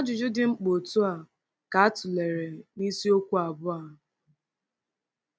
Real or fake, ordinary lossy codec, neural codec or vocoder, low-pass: real; none; none; none